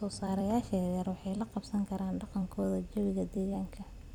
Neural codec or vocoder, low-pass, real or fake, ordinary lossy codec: vocoder, 44.1 kHz, 128 mel bands every 256 samples, BigVGAN v2; 19.8 kHz; fake; none